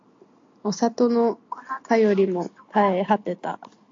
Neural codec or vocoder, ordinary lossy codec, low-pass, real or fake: none; AAC, 48 kbps; 7.2 kHz; real